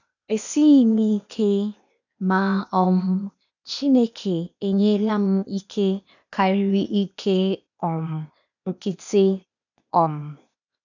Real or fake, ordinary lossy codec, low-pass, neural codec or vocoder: fake; none; 7.2 kHz; codec, 16 kHz, 0.8 kbps, ZipCodec